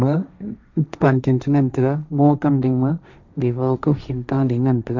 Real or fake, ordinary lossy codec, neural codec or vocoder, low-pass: fake; none; codec, 16 kHz, 1.1 kbps, Voila-Tokenizer; 7.2 kHz